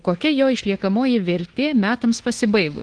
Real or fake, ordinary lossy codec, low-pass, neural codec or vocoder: fake; Opus, 16 kbps; 9.9 kHz; codec, 24 kHz, 1.2 kbps, DualCodec